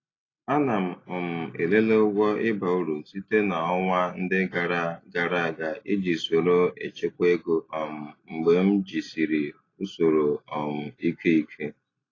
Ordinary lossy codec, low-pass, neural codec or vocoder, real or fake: AAC, 32 kbps; 7.2 kHz; none; real